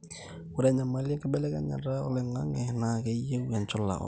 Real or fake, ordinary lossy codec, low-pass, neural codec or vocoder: real; none; none; none